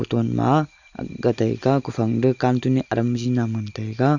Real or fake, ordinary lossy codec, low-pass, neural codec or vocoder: real; Opus, 64 kbps; 7.2 kHz; none